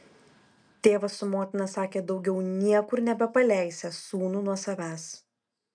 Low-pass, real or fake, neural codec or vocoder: 9.9 kHz; real; none